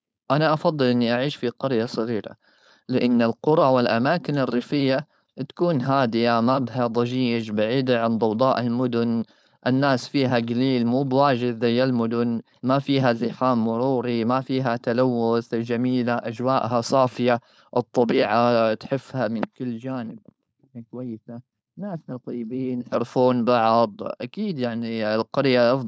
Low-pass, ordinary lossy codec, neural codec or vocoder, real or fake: none; none; codec, 16 kHz, 4.8 kbps, FACodec; fake